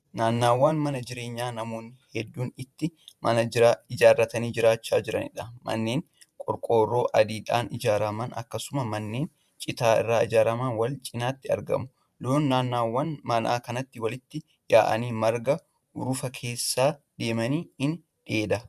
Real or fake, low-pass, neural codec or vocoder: fake; 14.4 kHz; vocoder, 44.1 kHz, 128 mel bands every 512 samples, BigVGAN v2